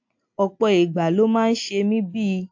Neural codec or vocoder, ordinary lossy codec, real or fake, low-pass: none; AAC, 48 kbps; real; 7.2 kHz